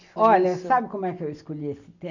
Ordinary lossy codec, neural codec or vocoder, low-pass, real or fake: none; none; 7.2 kHz; real